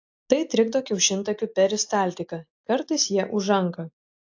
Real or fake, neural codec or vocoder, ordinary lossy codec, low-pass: real; none; AAC, 48 kbps; 7.2 kHz